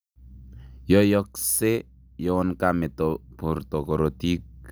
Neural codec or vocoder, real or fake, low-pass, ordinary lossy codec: none; real; none; none